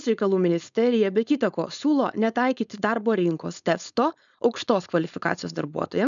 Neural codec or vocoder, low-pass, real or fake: codec, 16 kHz, 4.8 kbps, FACodec; 7.2 kHz; fake